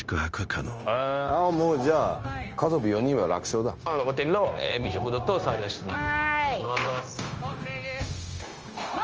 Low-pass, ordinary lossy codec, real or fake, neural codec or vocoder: 7.2 kHz; Opus, 24 kbps; fake; codec, 16 kHz, 0.9 kbps, LongCat-Audio-Codec